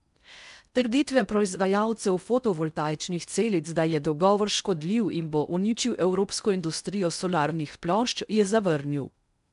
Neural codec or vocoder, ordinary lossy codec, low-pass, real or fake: codec, 16 kHz in and 24 kHz out, 0.6 kbps, FocalCodec, streaming, 4096 codes; none; 10.8 kHz; fake